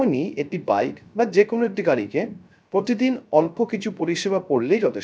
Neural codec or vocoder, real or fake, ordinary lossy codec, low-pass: codec, 16 kHz, 0.3 kbps, FocalCodec; fake; none; none